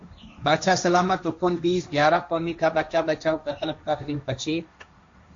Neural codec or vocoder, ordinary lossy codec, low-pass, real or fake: codec, 16 kHz, 1.1 kbps, Voila-Tokenizer; MP3, 48 kbps; 7.2 kHz; fake